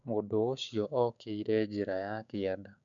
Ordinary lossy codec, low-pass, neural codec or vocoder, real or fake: none; 7.2 kHz; codec, 16 kHz, 6 kbps, DAC; fake